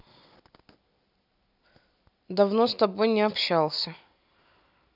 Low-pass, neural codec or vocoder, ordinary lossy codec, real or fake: 5.4 kHz; none; none; real